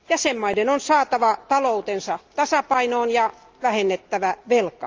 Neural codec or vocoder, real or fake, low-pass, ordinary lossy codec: none; real; 7.2 kHz; Opus, 24 kbps